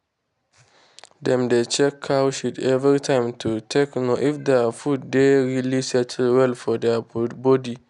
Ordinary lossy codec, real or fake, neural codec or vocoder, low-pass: none; real; none; 10.8 kHz